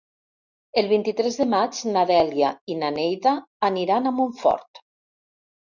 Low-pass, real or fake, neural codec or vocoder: 7.2 kHz; real; none